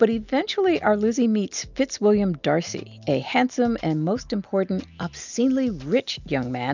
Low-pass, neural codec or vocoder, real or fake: 7.2 kHz; none; real